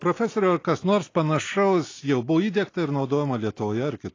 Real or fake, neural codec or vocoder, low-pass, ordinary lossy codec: real; none; 7.2 kHz; AAC, 32 kbps